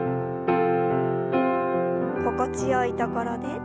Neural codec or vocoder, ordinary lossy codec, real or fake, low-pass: none; none; real; none